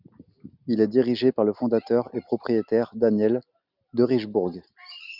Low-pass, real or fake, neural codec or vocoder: 5.4 kHz; real; none